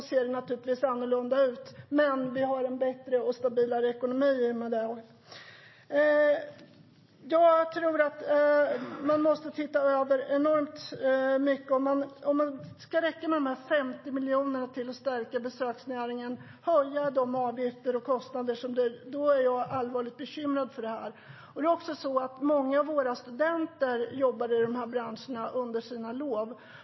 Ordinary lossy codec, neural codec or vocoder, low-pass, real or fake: MP3, 24 kbps; none; 7.2 kHz; real